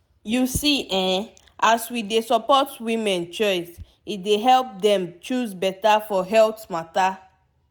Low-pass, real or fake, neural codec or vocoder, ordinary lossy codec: none; real; none; none